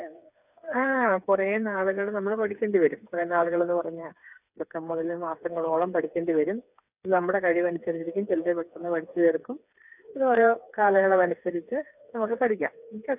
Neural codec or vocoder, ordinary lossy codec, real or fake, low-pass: codec, 16 kHz, 4 kbps, FreqCodec, smaller model; none; fake; 3.6 kHz